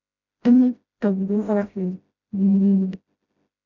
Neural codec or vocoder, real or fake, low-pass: codec, 16 kHz, 0.5 kbps, FreqCodec, smaller model; fake; 7.2 kHz